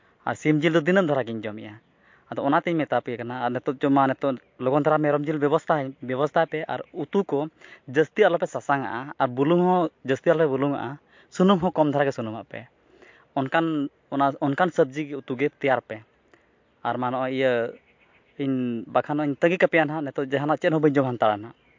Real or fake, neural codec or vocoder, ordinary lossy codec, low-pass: real; none; MP3, 48 kbps; 7.2 kHz